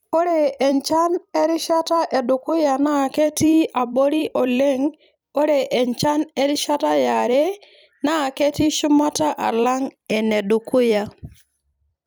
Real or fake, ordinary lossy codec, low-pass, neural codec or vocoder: fake; none; none; vocoder, 44.1 kHz, 128 mel bands every 256 samples, BigVGAN v2